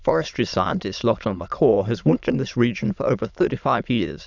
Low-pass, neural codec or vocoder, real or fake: 7.2 kHz; autoencoder, 22.05 kHz, a latent of 192 numbers a frame, VITS, trained on many speakers; fake